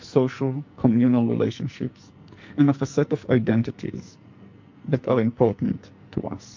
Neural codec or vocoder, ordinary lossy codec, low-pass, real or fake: codec, 44.1 kHz, 2.6 kbps, SNAC; MP3, 48 kbps; 7.2 kHz; fake